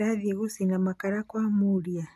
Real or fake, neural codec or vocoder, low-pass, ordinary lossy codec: real; none; 14.4 kHz; none